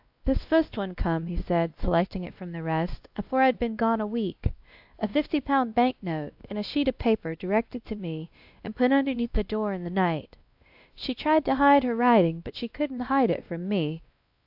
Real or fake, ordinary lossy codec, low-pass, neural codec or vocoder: fake; AAC, 48 kbps; 5.4 kHz; codec, 16 kHz, 1 kbps, X-Codec, WavLM features, trained on Multilingual LibriSpeech